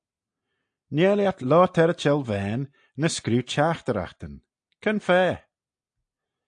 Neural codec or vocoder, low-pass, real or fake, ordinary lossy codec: none; 9.9 kHz; real; AAC, 64 kbps